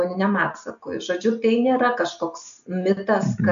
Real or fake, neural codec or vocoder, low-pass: real; none; 7.2 kHz